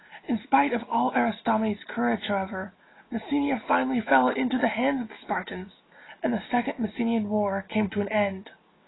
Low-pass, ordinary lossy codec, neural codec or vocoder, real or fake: 7.2 kHz; AAC, 16 kbps; none; real